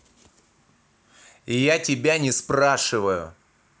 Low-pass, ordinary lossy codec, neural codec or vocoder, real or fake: none; none; none; real